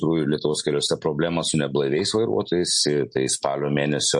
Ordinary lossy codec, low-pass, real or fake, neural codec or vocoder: MP3, 48 kbps; 10.8 kHz; real; none